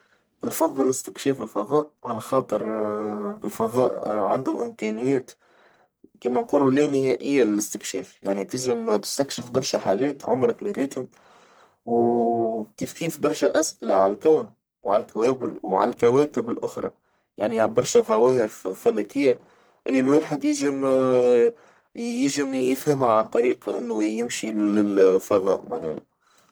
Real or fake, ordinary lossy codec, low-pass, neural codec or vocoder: fake; none; none; codec, 44.1 kHz, 1.7 kbps, Pupu-Codec